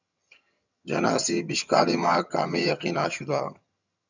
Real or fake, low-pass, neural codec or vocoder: fake; 7.2 kHz; vocoder, 22.05 kHz, 80 mel bands, HiFi-GAN